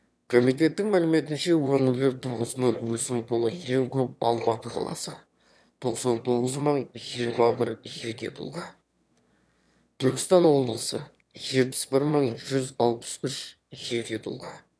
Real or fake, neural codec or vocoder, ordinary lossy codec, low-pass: fake; autoencoder, 22.05 kHz, a latent of 192 numbers a frame, VITS, trained on one speaker; none; none